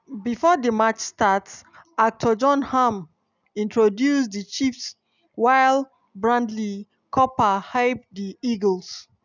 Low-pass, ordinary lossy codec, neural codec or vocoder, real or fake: 7.2 kHz; none; none; real